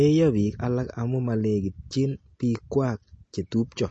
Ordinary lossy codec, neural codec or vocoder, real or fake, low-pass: MP3, 32 kbps; none; real; 10.8 kHz